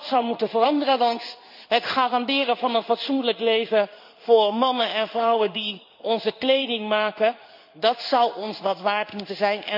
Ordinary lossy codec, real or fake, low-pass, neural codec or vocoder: none; fake; 5.4 kHz; codec, 16 kHz in and 24 kHz out, 1 kbps, XY-Tokenizer